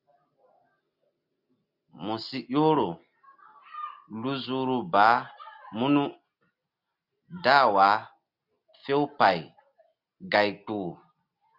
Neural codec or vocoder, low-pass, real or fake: none; 5.4 kHz; real